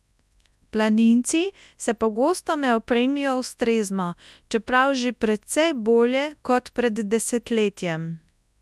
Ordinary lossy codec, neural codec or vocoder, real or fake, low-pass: none; codec, 24 kHz, 0.9 kbps, WavTokenizer, large speech release; fake; none